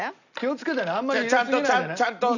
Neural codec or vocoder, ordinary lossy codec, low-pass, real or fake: none; none; 7.2 kHz; real